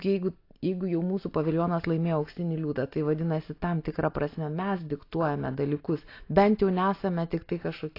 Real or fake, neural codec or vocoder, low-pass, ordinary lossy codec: real; none; 5.4 kHz; AAC, 32 kbps